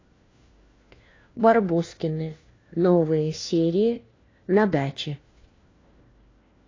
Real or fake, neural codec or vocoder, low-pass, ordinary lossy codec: fake; codec, 16 kHz, 1 kbps, FunCodec, trained on LibriTTS, 50 frames a second; 7.2 kHz; AAC, 32 kbps